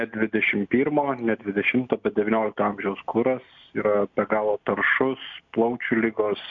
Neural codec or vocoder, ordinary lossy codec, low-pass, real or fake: none; MP3, 64 kbps; 7.2 kHz; real